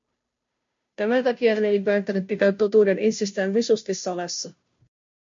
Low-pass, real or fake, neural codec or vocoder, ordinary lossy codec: 7.2 kHz; fake; codec, 16 kHz, 0.5 kbps, FunCodec, trained on Chinese and English, 25 frames a second; MP3, 48 kbps